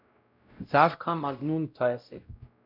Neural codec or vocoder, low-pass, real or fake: codec, 16 kHz, 0.5 kbps, X-Codec, WavLM features, trained on Multilingual LibriSpeech; 5.4 kHz; fake